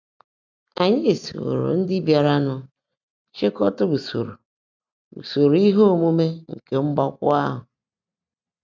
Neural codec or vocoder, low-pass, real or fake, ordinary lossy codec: none; 7.2 kHz; real; none